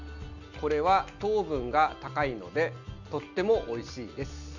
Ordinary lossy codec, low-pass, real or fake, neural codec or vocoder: none; 7.2 kHz; real; none